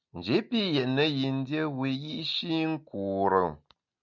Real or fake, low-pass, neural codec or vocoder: real; 7.2 kHz; none